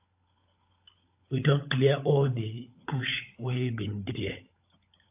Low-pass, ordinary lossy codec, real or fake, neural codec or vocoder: 3.6 kHz; AAC, 32 kbps; fake; codec, 16 kHz, 16 kbps, FunCodec, trained on LibriTTS, 50 frames a second